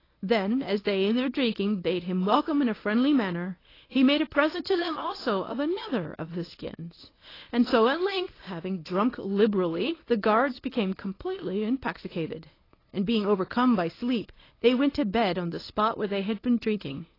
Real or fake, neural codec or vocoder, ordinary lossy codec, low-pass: fake; codec, 24 kHz, 0.9 kbps, WavTokenizer, medium speech release version 1; AAC, 24 kbps; 5.4 kHz